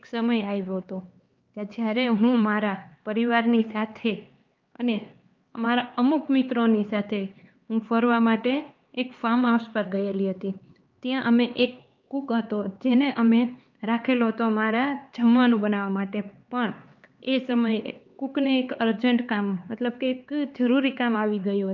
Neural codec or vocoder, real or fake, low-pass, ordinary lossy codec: codec, 16 kHz, 4 kbps, X-Codec, HuBERT features, trained on LibriSpeech; fake; 7.2 kHz; Opus, 24 kbps